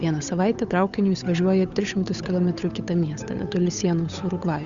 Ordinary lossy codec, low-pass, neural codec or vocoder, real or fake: Opus, 64 kbps; 7.2 kHz; codec, 16 kHz, 8 kbps, FunCodec, trained on Chinese and English, 25 frames a second; fake